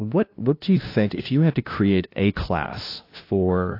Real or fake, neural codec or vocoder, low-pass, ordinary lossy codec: fake; codec, 16 kHz, 0.5 kbps, FunCodec, trained on LibriTTS, 25 frames a second; 5.4 kHz; AAC, 32 kbps